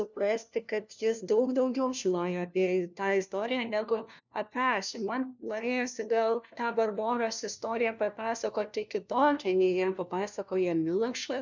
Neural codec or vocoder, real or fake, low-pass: codec, 16 kHz, 1 kbps, FunCodec, trained on LibriTTS, 50 frames a second; fake; 7.2 kHz